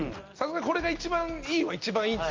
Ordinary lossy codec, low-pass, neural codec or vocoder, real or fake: Opus, 24 kbps; 7.2 kHz; none; real